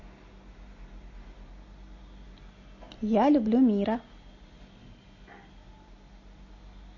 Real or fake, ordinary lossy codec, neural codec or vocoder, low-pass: real; MP3, 32 kbps; none; 7.2 kHz